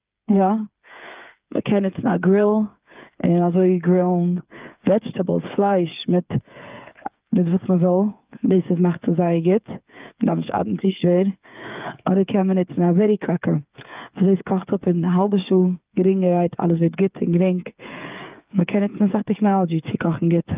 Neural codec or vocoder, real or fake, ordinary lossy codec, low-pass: codec, 16 kHz, 8 kbps, FreqCodec, smaller model; fake; Opus, 24 kbps; 3.6 kHz